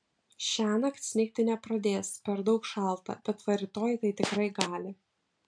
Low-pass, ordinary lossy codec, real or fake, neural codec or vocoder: 9.9 kHz; MP3, 64 kbps; real; none